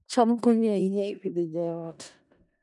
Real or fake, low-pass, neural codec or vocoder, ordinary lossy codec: fake; 10.8 kHz; codec, 16 kHz in and 24 kHz out, 0.4 kbps, LongCat-Audio-Codec, four codebook decoder; none